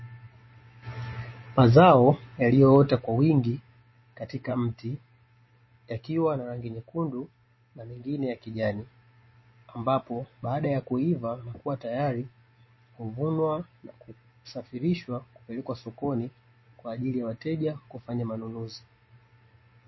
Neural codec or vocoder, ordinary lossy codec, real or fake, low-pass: none; MP3, 24 kbps; real; 7.2 kHz